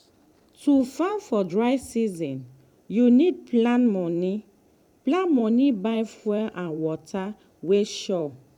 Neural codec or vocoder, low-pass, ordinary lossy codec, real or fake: vocoder, 44.1 kHz, 128 mel bands every 512 samples, BigVGAN v2; 19.8 kHz; none; fake